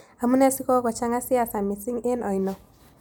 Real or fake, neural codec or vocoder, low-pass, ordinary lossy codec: real; none; none; none